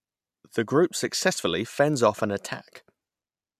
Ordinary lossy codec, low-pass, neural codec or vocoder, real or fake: MP3, 96 kbps; 14.4 kHz; none; real